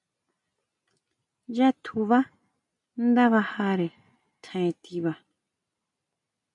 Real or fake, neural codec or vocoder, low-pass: real; none; 10.8 kHz